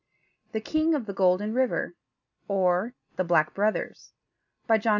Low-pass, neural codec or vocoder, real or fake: 7.2 kHz; none; real